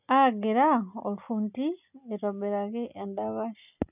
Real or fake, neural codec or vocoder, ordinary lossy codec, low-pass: real; none; none; 3.6 kHz